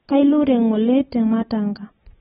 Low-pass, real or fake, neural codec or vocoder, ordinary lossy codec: 19.8 kHz; fake; vocoder, 44.1 kHz, 128 mel bands every 256 samples, BigVGAN v2; AAC, 16 kbps